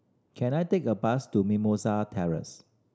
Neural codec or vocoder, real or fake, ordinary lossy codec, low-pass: none; real; none; none